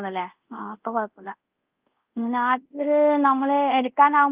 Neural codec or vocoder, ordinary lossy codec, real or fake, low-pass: codec, 24 kHz, 0.5 kbps, DualCodec; Opus, 32 kbps; fake; 3.6 kHz